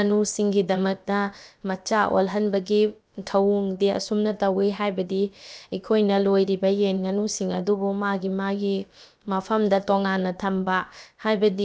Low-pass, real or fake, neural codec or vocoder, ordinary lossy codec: none; fake; codec, 16 kHz, about 1 kbps, DyCAST, with the encoder's durations; none